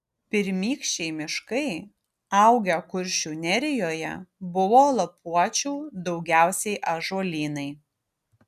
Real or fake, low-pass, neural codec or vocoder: real; 14.4 kHz; none